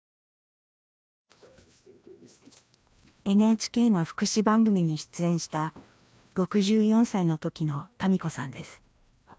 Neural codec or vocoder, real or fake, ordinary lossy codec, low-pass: codec, 16 kHz, 1 kbps, FreqCodec, larger model; fake; none; none